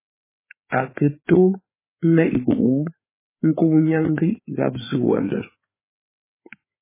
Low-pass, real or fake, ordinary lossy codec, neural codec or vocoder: 3.6 kHz; fake; MP3, 16 kbps; codec, 16 kHz, 4 kbps, FreqCodec, larger model